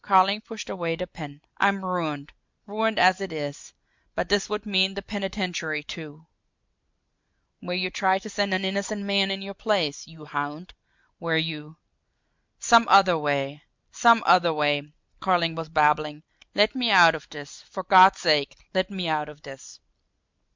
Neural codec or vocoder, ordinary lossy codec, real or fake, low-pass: none; MP3, 64 kbps; real; 7.2 kHz